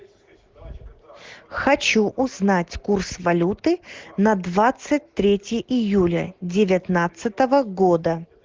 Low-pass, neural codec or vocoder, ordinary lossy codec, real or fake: 7.2 kHz; none; Opus, 16 kbps; real